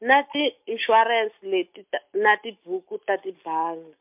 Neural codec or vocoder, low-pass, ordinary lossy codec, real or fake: none; 3.6 kHz; MP3, 32 kbps; real